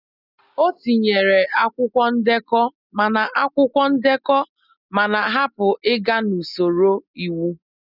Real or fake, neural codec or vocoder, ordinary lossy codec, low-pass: real; none; none; 5.4 kHz